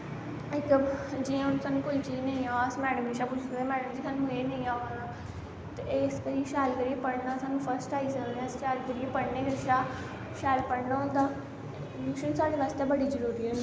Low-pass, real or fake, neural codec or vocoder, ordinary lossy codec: none; real; none; none